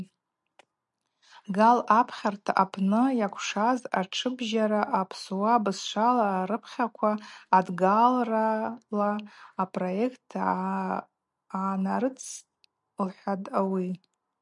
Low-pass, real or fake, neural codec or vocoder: 10.8 kHz; real; none